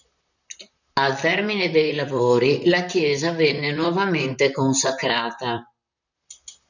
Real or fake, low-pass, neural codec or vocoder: fake; 7.2 kHz; vocoder, 44.1 kHz, 128 mel bands, Pupu-Vocoder